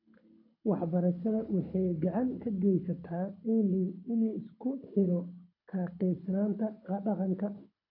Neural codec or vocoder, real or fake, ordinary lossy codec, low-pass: codec, 24 kHz, 6 kbps, HILCodec; fake; AAC, 48 kbps; 5.4 kHz